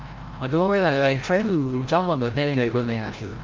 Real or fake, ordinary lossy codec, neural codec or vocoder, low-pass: fake; Opus, 24 kbps; codec, 16 kHz, 0.5 kbps, FreqCodec, larger model; 7.2 kHz